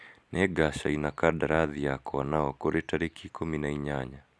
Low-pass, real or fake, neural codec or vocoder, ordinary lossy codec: none; real; none; none